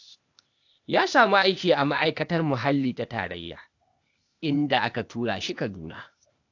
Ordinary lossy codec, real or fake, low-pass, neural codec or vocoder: AAC, 48 kbps; fake; 7.2 kHz; codec, 16 kHz, 0.8 kbps, ZipCodec